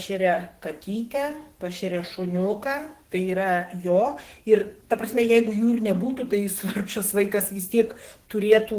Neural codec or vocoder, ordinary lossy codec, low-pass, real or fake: codec, 32 kHz, 1.9 kbps, SNAC; Opus, 16 kbps; 14.4 kHz; fake